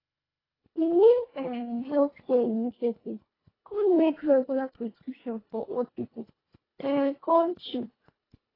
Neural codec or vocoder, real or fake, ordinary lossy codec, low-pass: codec, 24 kHz, 1.5 kbps, HILCodec; fake; AAC, 24 kbps; 5.4 kHz